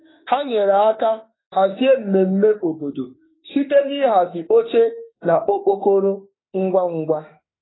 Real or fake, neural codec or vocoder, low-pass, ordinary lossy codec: fake; autoencoder, 48 kHz, 32 numbers a frame, DAC-VAE, trained on Japanese speech; 7.2 kHz; AAC, 16 kbps